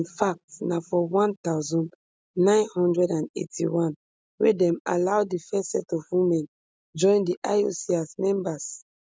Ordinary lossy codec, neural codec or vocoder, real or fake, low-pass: none; none; real; none